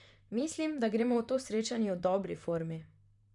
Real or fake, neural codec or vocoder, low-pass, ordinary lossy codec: fake; vocoder, 44.1 kHz, 128 mel bands, Pupu-Vocoder; 10.8 kHz; none